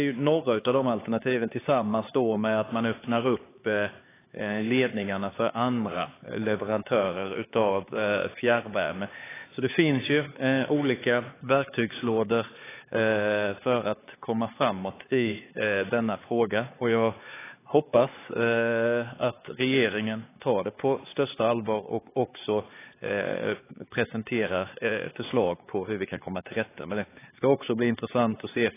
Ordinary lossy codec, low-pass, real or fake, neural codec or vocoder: AAC, 16 kbps; 3.6 kHz; fake; codec, 16 kHz, 4 kbps, X-Codec, HuBERT features, trained on LibriSpeech